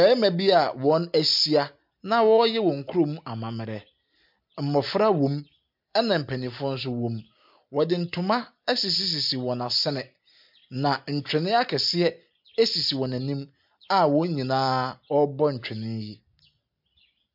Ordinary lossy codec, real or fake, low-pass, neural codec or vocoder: MP3, 48 kbps; real; 5.4 kHz; none